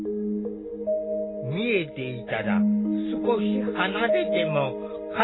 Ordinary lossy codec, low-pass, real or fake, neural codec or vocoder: AAC, 16 kbps; 7.2 kHz; real; none